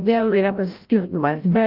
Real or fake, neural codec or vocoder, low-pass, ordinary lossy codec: fake; codec, 16 kHz, 0.5 kbps, FreqCodec, larger model; 5.4 kHz; Opus, 24 kbps